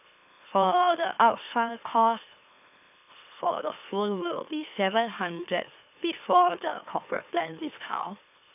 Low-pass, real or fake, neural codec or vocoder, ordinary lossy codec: 3.6 kHz; fake; autoencoder, 44.1 kHz, a latent of 192 numbers a frame, MeloTTS; none